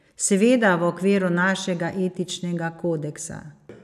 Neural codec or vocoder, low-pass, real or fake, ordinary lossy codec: none; 14.4 kHz; real; none